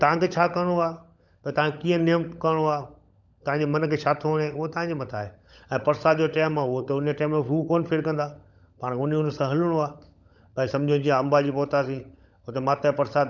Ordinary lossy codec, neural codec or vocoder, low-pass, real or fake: none; codec, 16 kHz, 16 kbps, FunCodec, trained on LibriTTS, 50 frames a second; 7.2 kHz; fake